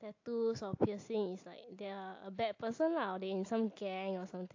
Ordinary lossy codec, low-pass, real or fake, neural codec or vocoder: AAC, 48 kbps; 7.2 kHz; real; none